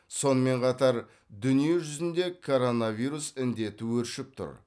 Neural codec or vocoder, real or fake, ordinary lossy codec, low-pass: none; real; none; none